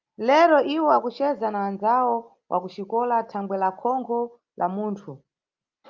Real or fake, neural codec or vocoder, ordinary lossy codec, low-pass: real; none; Opus, 24 kbps; 7.2 kHz